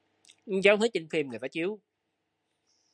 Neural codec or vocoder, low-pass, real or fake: none; 9.9 kHz; real